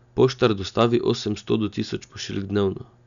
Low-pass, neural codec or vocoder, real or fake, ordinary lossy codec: 7.2 kHz; none; real; none